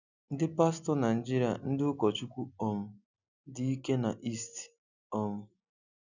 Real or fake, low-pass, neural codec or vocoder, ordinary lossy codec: real; 7.2 kHz; none; none